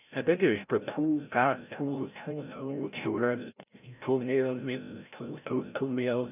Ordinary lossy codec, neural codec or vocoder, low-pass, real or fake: none; codec, 16 kHz, 0.5 kbps, FreqCodec, larger model; 3.6 kHz; fake